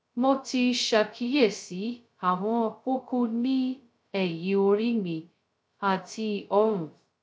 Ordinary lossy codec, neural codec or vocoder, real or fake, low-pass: none; codec, 16 kHz, 0.2 kbps, FocalCodec; fake; none